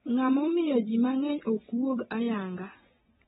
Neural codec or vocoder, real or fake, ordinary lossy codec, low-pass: vocoder, 24 kHz, 100 mel bands, Vocos; fake; AAC, 16 kbps; 10.8 kHz